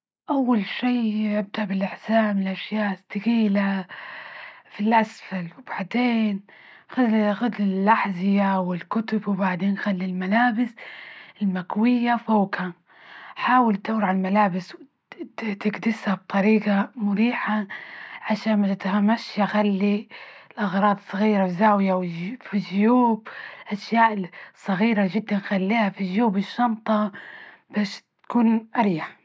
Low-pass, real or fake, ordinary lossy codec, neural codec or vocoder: none; real; none; none